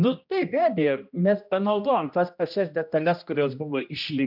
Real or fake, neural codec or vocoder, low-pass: fake; codec, 16 kHz, 1 kbps, X-Codec, HuBERT features, trained on general audio; 5.4 kHz